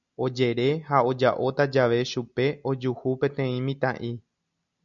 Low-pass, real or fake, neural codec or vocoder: 7.2 kHz; real; none